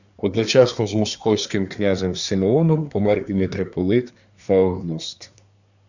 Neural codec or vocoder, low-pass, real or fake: codec, 24 kHz, 1 kbps, SNAC; 7.2 kHz; fake